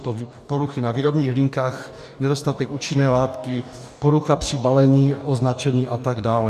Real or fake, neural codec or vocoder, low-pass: fake; codec, 44.1 kHz, 2.6 kbps, DAC; 14.4 kHz